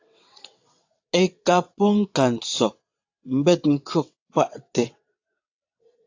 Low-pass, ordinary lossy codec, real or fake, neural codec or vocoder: 7.2 kHz; AAC, 48 kbps; fake; vocoder, 22.05 kHz, 80 mel bands, WaveNeXt